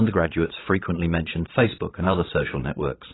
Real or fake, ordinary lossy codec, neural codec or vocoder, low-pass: fake; AAC, 16 kbps; vocoder, 22.05 kHz, 80 mel bands, Vocos; 7.2 kHz